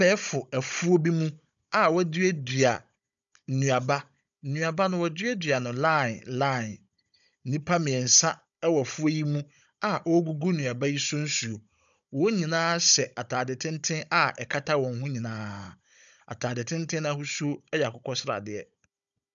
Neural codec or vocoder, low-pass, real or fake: codec, 16 kHz, 16 kbps, FunCodec, trained on Chinese and English, 50 frames a second; 7.2 kHz; fake